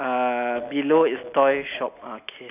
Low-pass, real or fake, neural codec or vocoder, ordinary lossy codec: 3.6 kHz; real; none; none